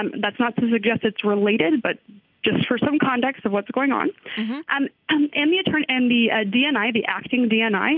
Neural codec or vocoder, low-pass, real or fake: none; 5.4 kHz; real